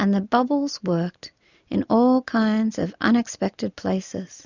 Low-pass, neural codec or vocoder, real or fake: 7.2 kHz; none; real